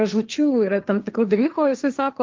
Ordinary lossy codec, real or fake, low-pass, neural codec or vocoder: Opus, 24 kbps; fake; 7.2 kHz; codec, 16 kHz, 1.1 kbps, Voila-Tokenizer